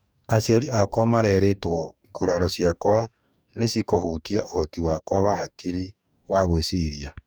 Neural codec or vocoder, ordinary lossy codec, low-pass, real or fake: codec, 44.1 kHz, 2.6 kbps, DAC; none; none; fake